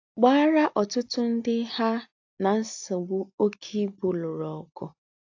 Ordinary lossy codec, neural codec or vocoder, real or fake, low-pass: AAC, 48 kbps; none; real; 7.2 kHz